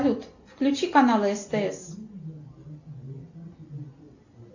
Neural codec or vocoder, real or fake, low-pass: none; real; 7.2 kHz